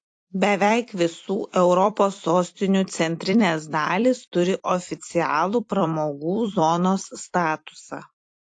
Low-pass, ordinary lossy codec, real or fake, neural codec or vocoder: 9.9 kHz; AAC, 48 kbps; fake; vocoder, 44.1 kHz, 128 mel bands every 256 samples, BigVGAN v2